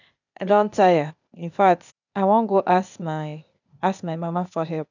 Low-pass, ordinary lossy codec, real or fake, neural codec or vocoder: 7.2 kHz; none; fake; codec, 16 kHz, 0.8 kbps, ZipCodec